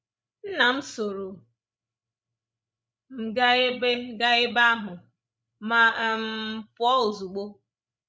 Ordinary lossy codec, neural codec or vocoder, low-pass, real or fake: none; codec, 16 kHz, 16 kbps, FreqCodec, larger model; none; fake